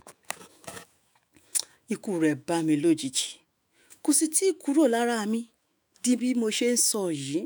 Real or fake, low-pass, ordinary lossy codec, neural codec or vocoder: fake; none; none; autoencoder, 48 kHz, 128 numbers a frame, DAC-VAE, trained on Japanese speech